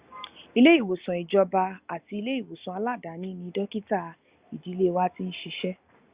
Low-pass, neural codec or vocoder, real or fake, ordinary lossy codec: 3.6 kHz; none; real; Opus, 64 kbps